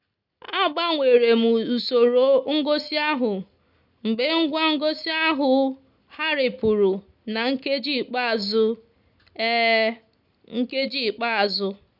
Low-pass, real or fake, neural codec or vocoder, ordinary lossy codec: 5.4 kHz; real; none; none